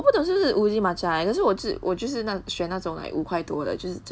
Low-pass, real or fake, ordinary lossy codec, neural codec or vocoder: none; real; none; none